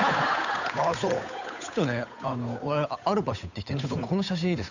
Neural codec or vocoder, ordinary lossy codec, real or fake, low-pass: codec, 16 kHz, 8 kbps, FunCodec, trained on Chinese and English, 25 frames a second; none; fake; 7.2 kHz